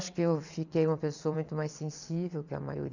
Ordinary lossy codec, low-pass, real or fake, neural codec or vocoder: none; 7.2 kHz; fake; vocoder, 22.05 kHz, 80 mel bands, WaveNeXt